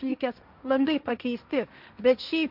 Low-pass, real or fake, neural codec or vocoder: 5.4 kHz; fake; codec, 16 kHz, 1.1 kbps, Voila-Tokenizer